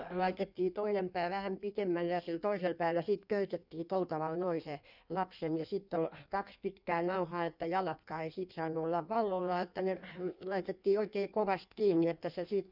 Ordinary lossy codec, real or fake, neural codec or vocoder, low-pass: none; fake; codec, 16 kHz in and 24 kHz out, 1.1 kbps, FireRedTTS-2 codec; 5.4 kHz